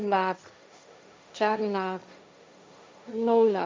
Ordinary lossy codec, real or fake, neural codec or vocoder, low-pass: none; fake; codec, 16 kHz, 1.1 kbps, Voila-Tokenizer; none